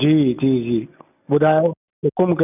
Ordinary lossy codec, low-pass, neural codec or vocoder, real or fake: none; 3.6 kHz; none; real